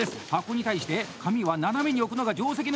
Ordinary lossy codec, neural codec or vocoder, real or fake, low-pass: none; none; real; none